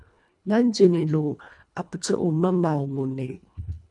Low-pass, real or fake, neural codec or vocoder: 10.8 kHz; fake; codec, 24 kHz, 1.5 kbps, HILCodec